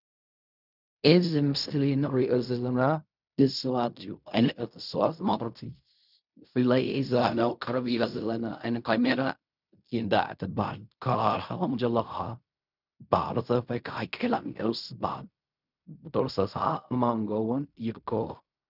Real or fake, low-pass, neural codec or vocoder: fake; 5.4 kHz; codec, 16 kHz in and 24 kHz out, 0.4 kbps, LongCat-Audio-Codec, fine tuned four codebook decoder